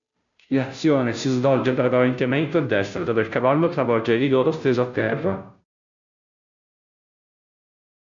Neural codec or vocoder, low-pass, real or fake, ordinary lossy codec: codec, 16 kHz, 0.5 kbps, FunCodec, trained on Chinese and English, 25 frames a second; 7.2 kHz; fake; MP3, 64 kbps